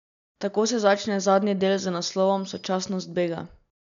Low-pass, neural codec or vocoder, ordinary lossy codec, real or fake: 7.2 kHz; none; none; real